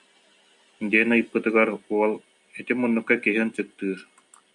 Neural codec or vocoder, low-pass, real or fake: none; 10.8 kHz; real